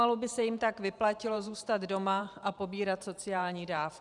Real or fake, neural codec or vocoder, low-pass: fake; vocoder, 44.1 kHz, 128 mel bands every 512 samples, BigVGAN v2; 10.8 kHz